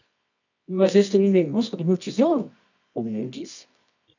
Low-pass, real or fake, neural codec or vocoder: 7.2 kHz; fake; codec, 24 kHz, 0.9 kbps, WavTokenizer, medium music audio release